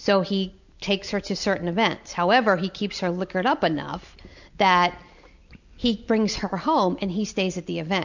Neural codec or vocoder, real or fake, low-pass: none; real; 7.2 kHz